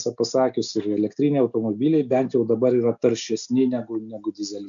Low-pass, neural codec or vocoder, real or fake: 7.2 kHz; none; real